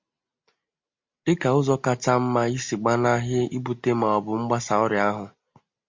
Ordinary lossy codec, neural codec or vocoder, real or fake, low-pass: MP3, 48 kbps; none; real; 7.2 kHz